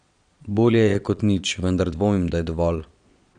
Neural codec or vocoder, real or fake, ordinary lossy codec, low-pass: vocoder, 22.05 kHz, 80 mel bands, Vocos; fake; none; 9.9 kHz